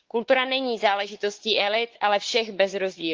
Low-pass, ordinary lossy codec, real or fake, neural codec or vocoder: 7.2 kHz; Opus, 16 kbps; fake; codec, 16 kHz, 4 kbps, X-Codec, WavLM features, trained on Multilingual LibriSpeech